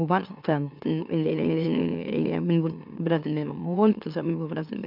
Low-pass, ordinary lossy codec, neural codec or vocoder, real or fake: 5.4 kHz; none; autoencoder, 44.1 kHz, a latent of 192 numbers a frame, MeloTTS; fake